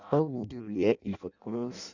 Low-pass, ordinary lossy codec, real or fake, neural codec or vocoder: 7.2 kHz; none; fake; codec, 16 kHz in and 24 kHz out, 0.6 kbps, FireRedTTS-2 codec